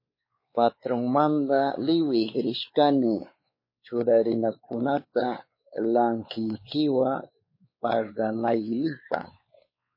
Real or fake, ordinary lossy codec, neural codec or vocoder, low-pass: fake; MP3, 24 kbps; codec, 16 kHz, 4 kbps, X-Codec, WavLM features, trained on Multilingual LibriSpeech; 5.4 kHz